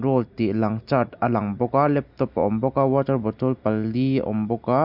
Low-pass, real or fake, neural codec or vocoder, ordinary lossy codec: 5.4 kHz; real; none; Opus, 64 kbps